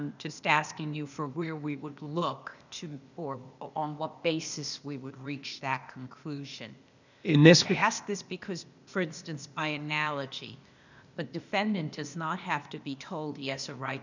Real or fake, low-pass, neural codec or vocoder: fake; 7.2 kHz; codec, 16 kHz, 0.8 kbps, ZipCodec